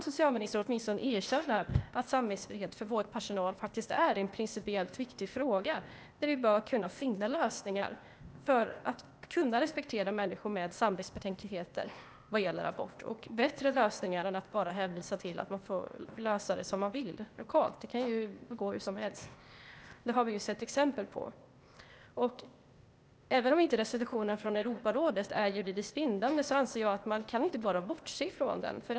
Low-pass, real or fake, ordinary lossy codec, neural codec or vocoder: none; fake; none; codec, 16 kHz, 0.8 kbps, ZipCodec